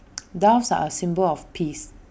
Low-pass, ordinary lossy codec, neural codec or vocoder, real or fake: none; none; none; real